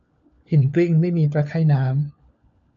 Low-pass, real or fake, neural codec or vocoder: 7.2 kHz; fake; codec, 16 kHz, 4 kbps, FunCodec, trained on LibriTTS, 50 frames a second